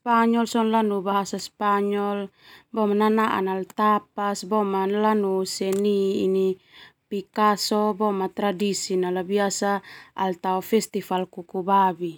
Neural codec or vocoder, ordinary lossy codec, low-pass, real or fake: none; none; 19.8 kHz; real